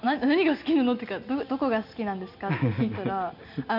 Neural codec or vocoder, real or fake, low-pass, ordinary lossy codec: none; real; 5.4 kHz; none